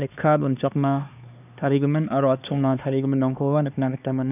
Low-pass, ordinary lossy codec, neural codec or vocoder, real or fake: 3.6 kHz; none; codec, 16 kHz, 2 kbps, X-Codec, HuBERT features, trained on LibriSpeech; fake